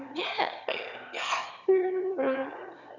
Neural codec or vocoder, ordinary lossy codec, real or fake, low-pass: autoencoder, 22.05 kHz, a latent of 192 numbers a frame, VITS, trained on one speaker; none; fake; 7.2 kHz